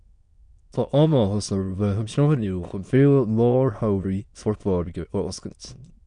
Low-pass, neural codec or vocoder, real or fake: 9.9 kHz; autoencoder, 22.05 kHz, a latent of 192 numbers a frame, VITS, trained on many speakers; fake